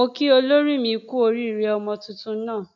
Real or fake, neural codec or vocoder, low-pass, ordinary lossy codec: real; none; 7.2 kHz; none